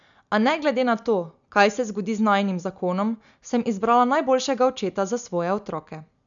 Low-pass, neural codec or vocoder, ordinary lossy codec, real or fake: 7.2 kHz; none; none; real